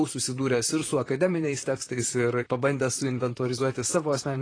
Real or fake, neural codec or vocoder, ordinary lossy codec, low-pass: fake; vocoder, 44.1 kHz, 128 mel bands, Pupu-Vocoder; AAC, 32 kbps; 9.9 kHz